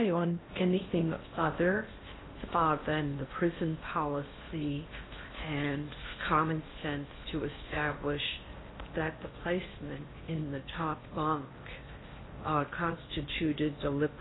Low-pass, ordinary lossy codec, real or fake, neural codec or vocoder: 7.2 kHz; AAC, 16 kbps; fake; codec, 16 kHz in and 24 kHz out, 0.6 kbps, FocalCodec, streaming, 4096 codes